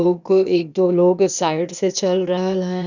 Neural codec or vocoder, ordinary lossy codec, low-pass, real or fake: codec, 16 kHz, 0.8 kbps, ZipCodec; none; 7.2 kHz; fake